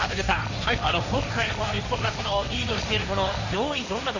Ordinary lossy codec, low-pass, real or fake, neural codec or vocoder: none; 7.2 kHz; fake; codec, 16 kHz, 1.1 kbps, Voila-Tokenizer